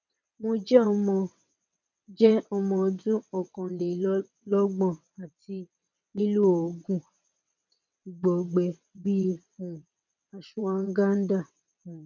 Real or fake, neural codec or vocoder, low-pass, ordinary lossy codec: fake; vocoder, 22.05 kHz, 80 mel bands, WaveNeXt; 7.2 kHz; none